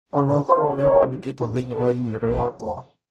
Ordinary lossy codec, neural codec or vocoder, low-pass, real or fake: AAC, 96 kbps; codec, 44.1 kHz, 0.9 kbps, DAC; 14.4 kHz; fake